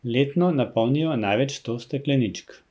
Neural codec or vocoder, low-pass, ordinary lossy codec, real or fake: none; none; none; real